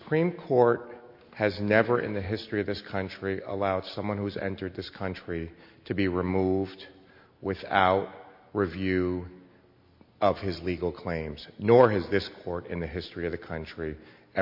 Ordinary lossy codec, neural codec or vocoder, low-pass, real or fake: MP3, 32 kbps; none; 5.4 kHz; real